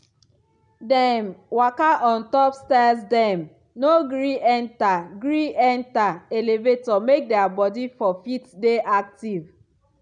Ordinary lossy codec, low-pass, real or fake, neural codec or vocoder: none; 9.9 kHz; real; none